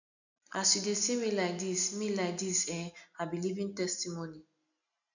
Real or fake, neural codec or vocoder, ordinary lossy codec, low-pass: real; none; none; 7.2 kHz